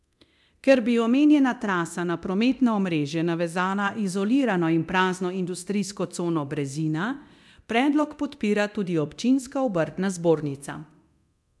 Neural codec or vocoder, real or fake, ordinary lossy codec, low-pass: codec, 24 kHz, 0.9 kbps, DualCodec; fake; none; none